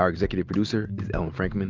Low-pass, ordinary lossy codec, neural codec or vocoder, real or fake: 7.2 kHz; Opus, 24 kbps; none; real